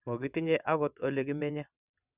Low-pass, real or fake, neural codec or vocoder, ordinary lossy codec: 3.6 kHz; fake; vocoder, 22.05 kHz, 80 mel bands, WaveNeXt; none